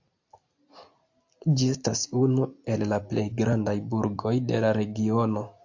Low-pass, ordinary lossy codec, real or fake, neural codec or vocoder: 7.2 kHz; AAC, 48 kbps; real; none